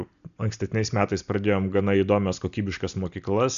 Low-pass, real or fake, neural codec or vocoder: 7.2 kHz; real; none